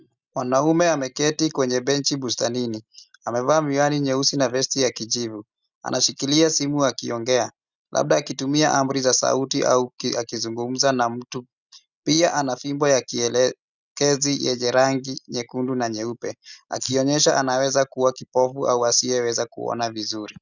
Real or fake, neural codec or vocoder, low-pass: real; none; 7.2 kHz